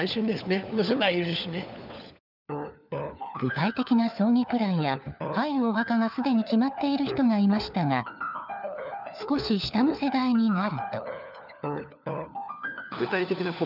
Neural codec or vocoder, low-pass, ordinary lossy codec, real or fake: codec, 16 kHz, 4 kbps, FunCodec, trained on LibriTTS, 50 frames a second; 5.4 kHz; none; fake